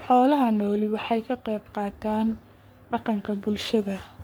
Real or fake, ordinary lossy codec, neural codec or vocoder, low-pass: fake; none; codec, 44.1 kHz, 3.4 kbps, Pupu-Codec; none